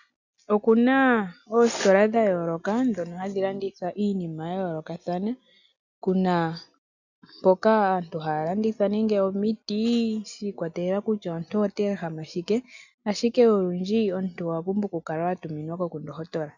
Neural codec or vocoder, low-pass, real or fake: none; 7.2 kHz; real